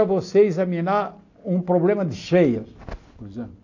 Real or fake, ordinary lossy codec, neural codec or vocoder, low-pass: real; AAC, 48 kbps; none; 7.2 kHz